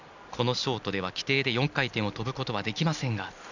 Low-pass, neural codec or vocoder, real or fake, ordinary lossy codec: 7.2 kHz; none; real; none